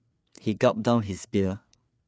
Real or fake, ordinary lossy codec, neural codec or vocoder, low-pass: fake; none; codec, 16 kHz, 4 kbps, FreqCodec, larger model; none